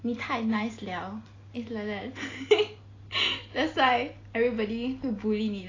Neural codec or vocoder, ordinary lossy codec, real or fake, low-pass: none; AAC, 32 kbps; real; 7.2 kHz